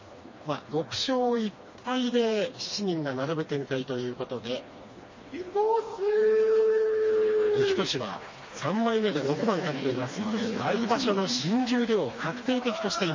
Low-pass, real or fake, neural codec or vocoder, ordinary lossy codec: 7.2 kHz; fake; codec, 16 kHz, 2 kbps, FreqCodec, smaller model; MP3, 32 kbps